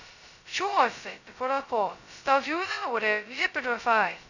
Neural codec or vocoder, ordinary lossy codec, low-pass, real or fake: codec, 16 kHz, 0.2 kbps, FocalCodec; none; 7.2 kHz; fake